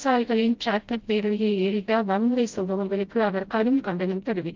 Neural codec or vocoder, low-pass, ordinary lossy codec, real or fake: codec, 16 kHz, 0.5 kbps, FreqCodec, smaller model; 7.2 kHz; Opus, 32 kbps; fake